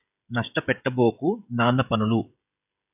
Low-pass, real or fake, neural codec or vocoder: 3.6 kHz; fake; codec, 16 kHz, 16 kbps, FreqCodec, smaller model